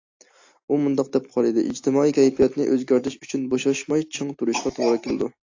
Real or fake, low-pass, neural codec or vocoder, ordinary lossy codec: real; 7.2 kHz; none; AAC, 48 kbps